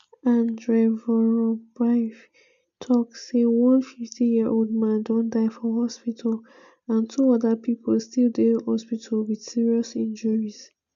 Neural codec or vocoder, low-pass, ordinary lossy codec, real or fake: none; 7.2 kHz; MP3, 96 kbps; real